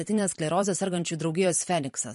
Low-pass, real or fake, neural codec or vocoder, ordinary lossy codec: 14.4 kHz; fake; vocoder, 44.1 kHz, 128 mel bands every 256 samples, BigVGAN v2; MP3, 48 kbps